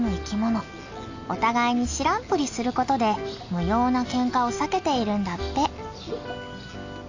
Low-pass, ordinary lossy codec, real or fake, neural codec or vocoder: 7.2 kHz; AAC, 48 kbps; real; none